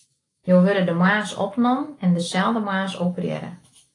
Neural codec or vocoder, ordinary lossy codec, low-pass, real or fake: autoencoder, 48 kHz, 128 numbers a frame, DAC-VAE, trained on Japanese speech; AAC, 32 kbps; 10.8 kHz; fake